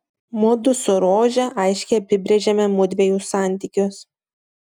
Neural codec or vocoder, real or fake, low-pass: none; real; 19.8 kHz